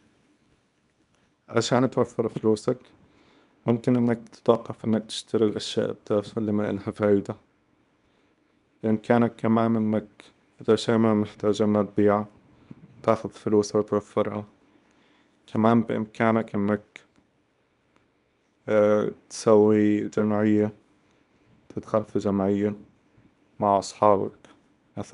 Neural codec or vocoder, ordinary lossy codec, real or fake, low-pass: codec, 24 kHz, 0.9 kbps, WavTokenizer, small release; none; fake; 10.8 kHz